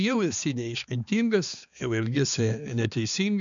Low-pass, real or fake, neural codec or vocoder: 7.2 kHz; fake; codec, 16 kHz, 2 kbps, X-Codec, HuBERT features, trained on balanced general audio